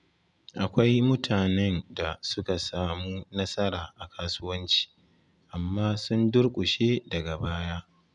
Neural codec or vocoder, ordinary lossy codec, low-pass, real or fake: none; none; 10.8 kHz; real